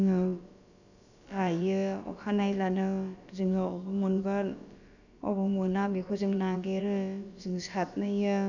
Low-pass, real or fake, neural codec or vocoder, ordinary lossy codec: 7.2 kHz; fake; codec, 16 kHz, about 1 kbps, DyCAST, with the encoder's durations; none